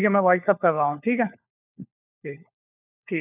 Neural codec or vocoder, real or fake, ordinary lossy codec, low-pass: codec, 16 kHz, 4 kbps, FunCodec, trained on LibriTTS, 50 frames a second; fake; none; 3.6 kHz